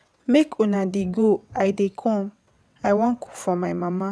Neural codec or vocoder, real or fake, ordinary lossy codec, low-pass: vocoder, 22.05 kHz, 80 mel bands, WaveNeXt; fake; none; none